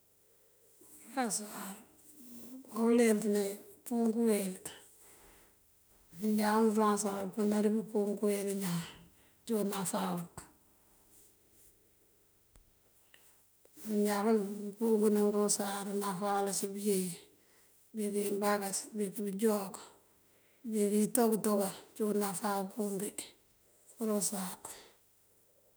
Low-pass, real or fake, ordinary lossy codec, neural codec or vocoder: none; fake; none; autoencoder, 48 kHz, 32 numbers a frame, DAC-VAE, trained on Japanese speech